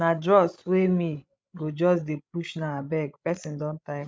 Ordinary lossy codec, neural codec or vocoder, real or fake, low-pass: none; codec, 16 kHz, 16 kbps, FreqCodec, larger model; fake; none